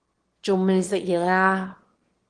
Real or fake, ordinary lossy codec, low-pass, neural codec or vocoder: fake; Opus, 16 kbps; 9.9 kHz; autoencoder, 22.05 kHz, a latent of 192 numbers a frame, VITS, trained on one speaker